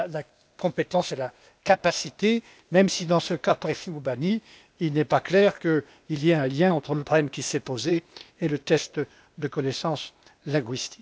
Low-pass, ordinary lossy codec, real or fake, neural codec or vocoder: none; none; fake; codec, 16 kHz, 0.8 kbps, ZipCodec